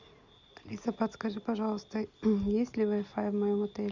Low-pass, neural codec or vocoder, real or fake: 7.2 kHz; none; real